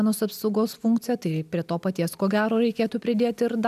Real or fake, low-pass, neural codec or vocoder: real; 14.4 kHz; none